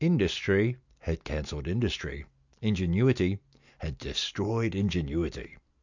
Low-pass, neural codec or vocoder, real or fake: 7.2 kHz; none; real